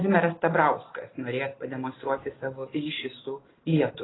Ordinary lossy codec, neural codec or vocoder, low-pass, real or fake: AAC, 16 kbps; none; 7.2 kHz; real